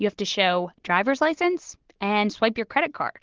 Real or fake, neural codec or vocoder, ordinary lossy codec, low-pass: real; none; Opus, 16 kbps; 7.2 kHz